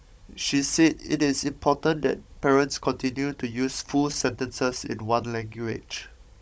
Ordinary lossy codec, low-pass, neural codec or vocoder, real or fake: none; none; codec, 16 kHz, 16 kbps, FunCodec, trained on Chinese and English, 50 frames a second; fake